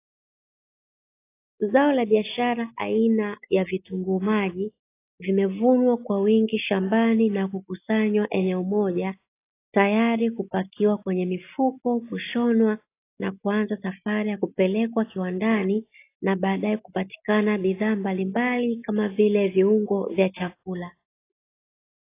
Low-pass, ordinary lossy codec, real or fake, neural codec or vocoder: 3.6 kHz; AAC, 24 kbps; real; none